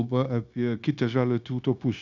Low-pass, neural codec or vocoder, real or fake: 7.2 kHz; codec, 16 kHz, 0.9 kbps, LongCat-Audio-Codec; fake